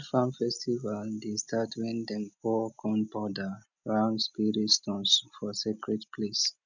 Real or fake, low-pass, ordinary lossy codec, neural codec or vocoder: real; 7.2 kHz; none; none